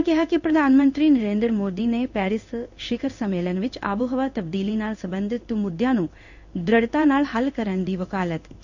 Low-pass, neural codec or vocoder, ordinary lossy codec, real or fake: 7.2 kHz; codec, 16 kHz in and 24 kHz out, 1 kbps, XY-Tokenizer; none; fake